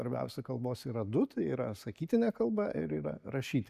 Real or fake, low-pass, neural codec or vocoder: real; 14.4 kHz; none